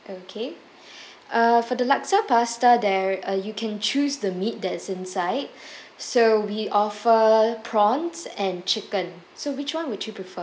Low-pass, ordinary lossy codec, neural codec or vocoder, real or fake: none; none; none; real